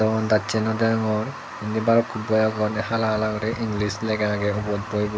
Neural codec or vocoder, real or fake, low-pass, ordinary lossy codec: none; real; none; none